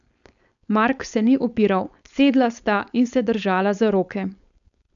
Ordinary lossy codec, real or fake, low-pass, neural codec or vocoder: none; fake; 7.2 kHz; codec, 16 kHz, 4.8 kbps, FACodec